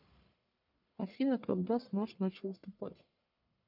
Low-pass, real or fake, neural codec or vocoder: 5.4 kHz; fake; codec, 44.1 kHz, 1.7 kbps, Pupu-Codec